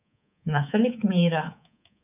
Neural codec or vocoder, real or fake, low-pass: codec, 24 kHz, 3.1 kbps, DualCodec; fake; 3.6 kHz